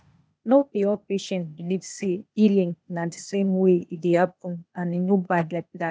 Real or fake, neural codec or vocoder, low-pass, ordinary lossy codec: fake; codec, 16 kHz, 0.8 kbps, ZipCodec; none; none